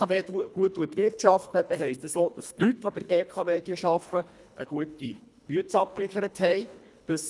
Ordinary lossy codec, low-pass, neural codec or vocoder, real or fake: none; none; codec, 24 kHz, 1.5 kbps, HILCodec; fake